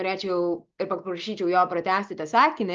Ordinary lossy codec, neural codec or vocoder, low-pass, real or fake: Opus, 24 kbps; none; 7.2 kHz; real